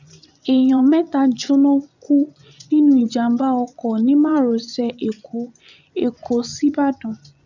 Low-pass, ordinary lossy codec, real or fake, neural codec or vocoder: 7.2 kHz; none; fake; vocoder, 24 kHz, 100 mel bands, Vocos